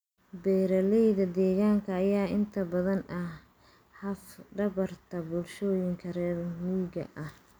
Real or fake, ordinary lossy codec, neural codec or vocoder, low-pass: real; none; none; none